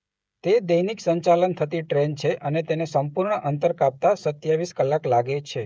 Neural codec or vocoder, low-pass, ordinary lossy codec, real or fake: codec, 16 kHz, 16 kbps, FreqCodec, smaller model; none; none; fake